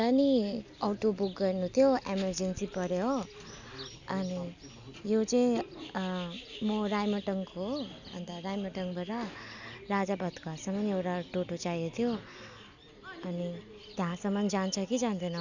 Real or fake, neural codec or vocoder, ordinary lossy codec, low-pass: real; none; none; 7.2 kHz